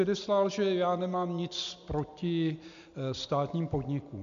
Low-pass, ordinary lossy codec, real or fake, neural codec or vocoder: 7.2 kHz; MP3, 64 kbps; real; none